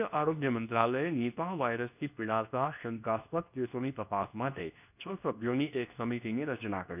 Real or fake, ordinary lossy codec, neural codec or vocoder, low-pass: fake; none; codec, 24 kHz, 0.9 kbps, WavTokenizer, medium speech release version 2; 3.6 kHz